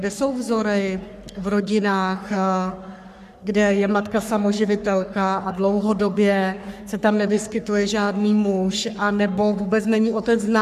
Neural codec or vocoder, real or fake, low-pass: codec, 44.1 kHz, 3.4 kbps, Pupu-Codec; fake; 14.4 kHz